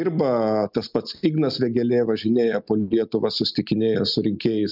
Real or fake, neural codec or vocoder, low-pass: real; none; 5.4 kHz